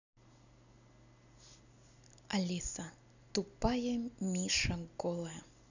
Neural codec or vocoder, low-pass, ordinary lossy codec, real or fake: none; 7.2 kHz; none; real